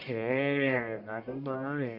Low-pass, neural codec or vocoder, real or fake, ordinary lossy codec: 5.4 kHz; codec, 44.1 kHz, 1.7 kbps, Pupu-Codec; fake; none